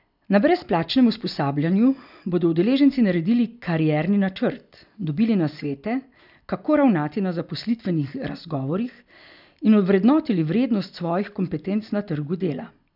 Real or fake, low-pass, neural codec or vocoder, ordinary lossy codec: real; 5.4 kHz; none; none